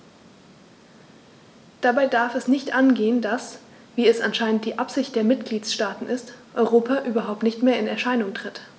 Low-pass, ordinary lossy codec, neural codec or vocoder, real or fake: none; none; none; real